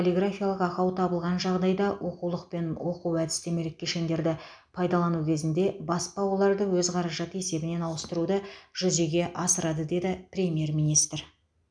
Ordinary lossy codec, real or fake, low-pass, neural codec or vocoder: none; real; 9.9 kHz; none